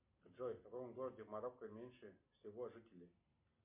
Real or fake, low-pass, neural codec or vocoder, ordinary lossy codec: real; 3.6 kHz; none; MP3, 32 kbps